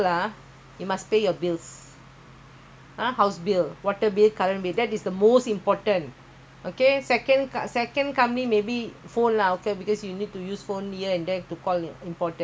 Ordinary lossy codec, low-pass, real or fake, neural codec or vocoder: none; none; real; none